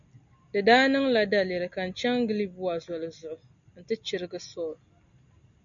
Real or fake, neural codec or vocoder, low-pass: real; none; 7.2 kHz